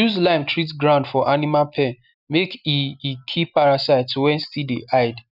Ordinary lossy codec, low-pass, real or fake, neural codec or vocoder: none; 5.4 kHz; real; none